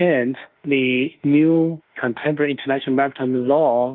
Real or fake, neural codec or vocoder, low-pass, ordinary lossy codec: fake; autoencoder, 48 kHz, 32 numbers a frame, DAC-VAE, trained on Japanese speech; 5.4 kHz; Opus, 32 kbps